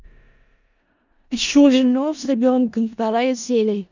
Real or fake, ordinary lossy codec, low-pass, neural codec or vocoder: fake; none; 7.2 kHz; codec, 16 kHz in and 24 kHz out, 0.4 kbps, LongCat-Audio-Codec, four codebook decoder